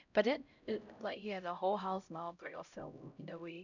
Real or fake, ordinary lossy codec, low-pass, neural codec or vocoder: fake; none; 7.2 kHz; codec, 16 kHz, 0.5 kbps, X-Codec, HuBERT features, trained on LibriSpeech